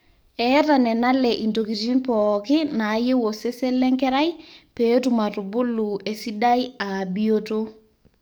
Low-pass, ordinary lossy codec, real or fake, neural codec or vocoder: none; none; fake; codec, 44.1 kHz, 7.8 kbps, DAC